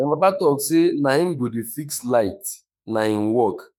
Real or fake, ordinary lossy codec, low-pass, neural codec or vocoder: fake; none; none; autoencoder, 48 kHz, 32 numbers a frame, DAC-VAE, trained on Japanese speech